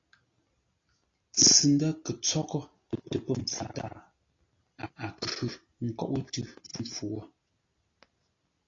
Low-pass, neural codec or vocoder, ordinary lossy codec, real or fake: 7.2 kHz; none; AAC, 32 kbps; real